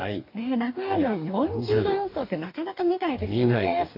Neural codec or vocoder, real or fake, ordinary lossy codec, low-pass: codec, 44.1 kHz, 2.6 kbps, DAC; fake; AAC, 32 kbps; 5.4 kHz